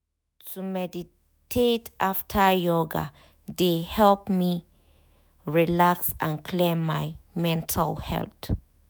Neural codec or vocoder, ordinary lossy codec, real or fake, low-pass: autoencoder, 48 kHz, 128 numbers a frame, DAC-VAE, trained on Japanese speech; none; fake; none